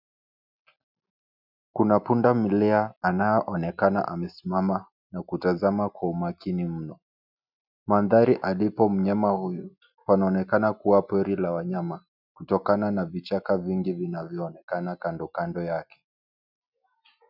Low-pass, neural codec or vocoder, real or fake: 5.4 kHz; none; real